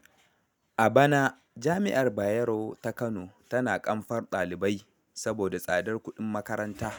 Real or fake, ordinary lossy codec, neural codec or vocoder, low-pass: real; none; none; none